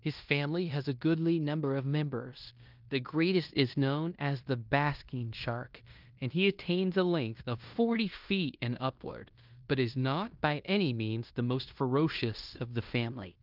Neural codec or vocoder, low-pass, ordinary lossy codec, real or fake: codec, 16 kHz in and 24 kHz out, 0.9 kbps, LongCat-Audio-Codec, fine tuned four codebook decoder; 5.4 kHz; Opus, 24 kbps; fake